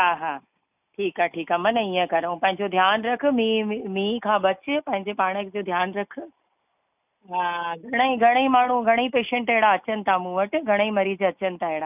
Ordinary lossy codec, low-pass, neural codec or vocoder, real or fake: none; 3.6 kHz; none; real